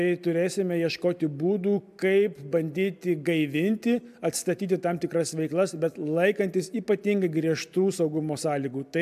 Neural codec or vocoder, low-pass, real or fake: none; 14.4 kHz; real